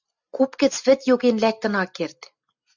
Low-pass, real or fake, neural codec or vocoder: 7.2 kHz; real; none